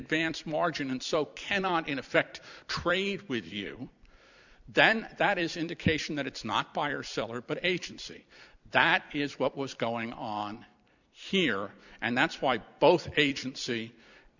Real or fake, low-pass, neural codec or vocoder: fake; 7.2 kHz; vocoder, 44.1 kHz, 128 mel bands every 256 samples, BigVGAN v2